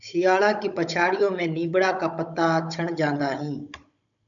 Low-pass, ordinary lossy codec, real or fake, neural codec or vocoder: 7.2 kHz; MP3, 96 kbps; fake; codec, 16 kHz, 16 kbps, FunCodec, trained on Chinese and English, 50 frames a second